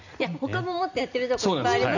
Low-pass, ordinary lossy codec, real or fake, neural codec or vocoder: 7.2 kHz; none; real; none